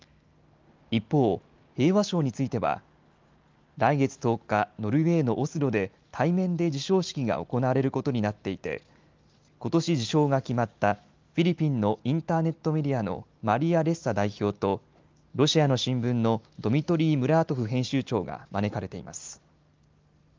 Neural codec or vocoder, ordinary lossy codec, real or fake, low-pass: none; Opus, 32 kbps; real; 7.2 kHz